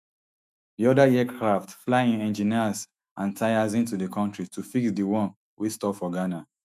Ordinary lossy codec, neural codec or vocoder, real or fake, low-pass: none; autoencoder, 48 kHz, 128 numbers a frame, DAC-VAE, trained on Japanese speech; fake; 14.4 kHz